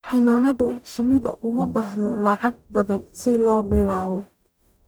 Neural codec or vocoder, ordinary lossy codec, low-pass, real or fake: codec, 44.1 kHz, 0.9 kbps, DAC; none; none; fake